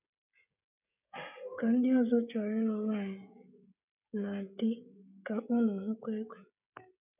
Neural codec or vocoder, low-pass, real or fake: codec, 16 kHz, 16 kbps, FreqCodec, smaller model; 3.6 kHz; fake